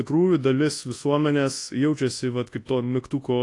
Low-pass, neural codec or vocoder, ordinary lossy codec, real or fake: 10.8 kHz; codec, 24 kHz, 0.9 kbps, WavTokenizer, large speech release; AAC, 48 kbps; fake